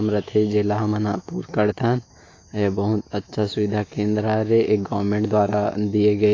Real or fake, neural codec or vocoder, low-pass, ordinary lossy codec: fake; autoencoder, 48 kHz, 128 numbers a frame, DAC-VAE, trained on Japanese speech; 7.2 kHz; AAC, 32 kbps